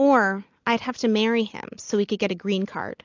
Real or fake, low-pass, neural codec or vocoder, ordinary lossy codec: real; 7.2 kHz; none; AAC, 48 kbps